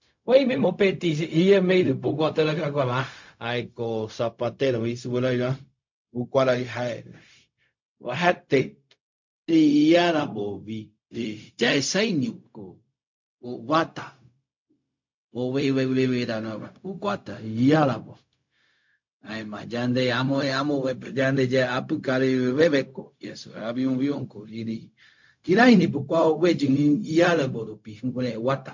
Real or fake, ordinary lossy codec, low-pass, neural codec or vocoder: fake; MP3, 48 kbps; 7.2 kHz; codec, 16 kHz, 0.4 kbps, LongCat-Audio-Codec